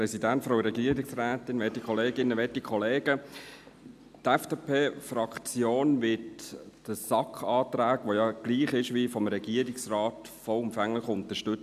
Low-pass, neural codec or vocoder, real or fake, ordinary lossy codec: 14.4 kHz; none; real; none